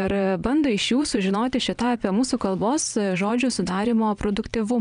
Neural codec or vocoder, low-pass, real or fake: vocoder, 22.05 kHz, 80 mel bands, Vocos; 9.9 kHz; fake